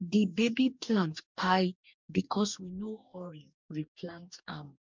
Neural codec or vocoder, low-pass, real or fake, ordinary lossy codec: codec, 44.1 kHz, 2.6 kbps, DAC; 7.2 kHz; fake; MP3, 64 kbps